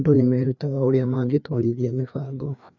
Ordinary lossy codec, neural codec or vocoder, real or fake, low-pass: none; codec, 16 kHz, 2 kbps, FreqCodec, larger model; fake; 7.2 kHz